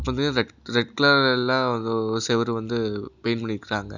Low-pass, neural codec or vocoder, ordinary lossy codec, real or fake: 7.2 kHz; none; none; real